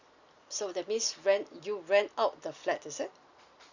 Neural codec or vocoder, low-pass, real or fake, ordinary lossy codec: none; 7.2 kHz; real; Opus, 64 kbps